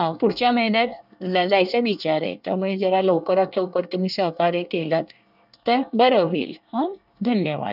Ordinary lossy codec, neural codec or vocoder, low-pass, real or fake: none; codec, 24 kHz, 1 kbps, SNAC; 5.4 kHz; fake